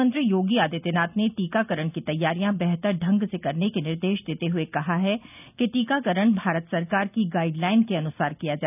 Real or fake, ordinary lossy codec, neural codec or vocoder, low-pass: real; none; none; 3.6 kHz